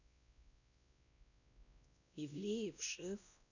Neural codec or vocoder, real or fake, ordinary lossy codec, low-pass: codec, 16 kHz, 1 kbps, X-Codec, WavLM features, trained on Multilingual LibriSpeech; fake; none; 7.2 kHz